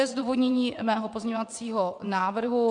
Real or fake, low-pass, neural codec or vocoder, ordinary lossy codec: fake; 9.9 kHz; vocoder, 22.05 kHz, 80 mel bands, WaveNeXt; MP3, 64 kbps